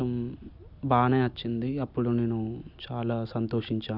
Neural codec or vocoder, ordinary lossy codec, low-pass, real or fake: none; none; 5.4 kHz; real